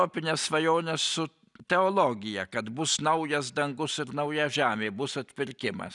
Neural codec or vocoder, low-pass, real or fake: none; 10.8 kHz; real